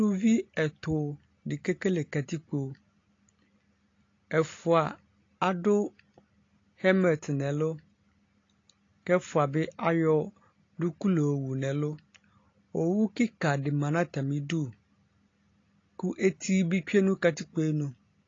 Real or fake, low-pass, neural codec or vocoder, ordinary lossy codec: real; 7.2 kHz; none; AAC, 32 kbps